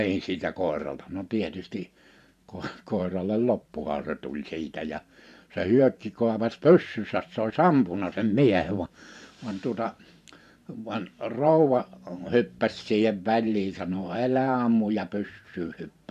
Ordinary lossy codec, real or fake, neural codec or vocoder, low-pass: none; fake; vocoder, 44.1 kHz, 128 mel bands every 512 samples, BigVGAN v2; 14.4 kHz